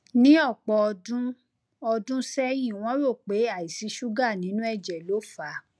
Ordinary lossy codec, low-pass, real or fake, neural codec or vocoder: none; none; real; none